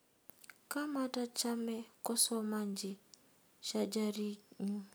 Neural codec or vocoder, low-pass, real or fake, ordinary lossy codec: none; none; real; none